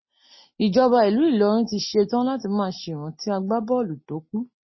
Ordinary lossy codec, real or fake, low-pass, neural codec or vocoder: MP3, 24 kbps; real; 7.2 kHz; none